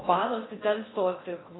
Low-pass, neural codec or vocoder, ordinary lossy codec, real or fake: 7.2 kHz; codec, 16 kHz in and 24 kHz out, 0.6 kbps, FocalCodec, streaming, 4096 codes; AAC, 16 kbps; fake